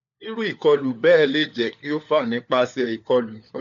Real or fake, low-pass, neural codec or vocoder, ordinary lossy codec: fake; 7.2 kHz; codec, 16 kHz, 4 kbps, FunCodec, trained on LibriTTS, 50 frames a second; none